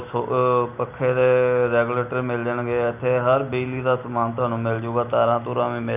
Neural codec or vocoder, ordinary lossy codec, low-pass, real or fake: none; none; 3.6 kHz; real